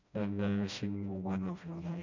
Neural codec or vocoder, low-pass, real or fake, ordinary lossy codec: codec, 16 kHz, 1 kbps, FreqCodec, smaller model; 7.2 kHz; fake; none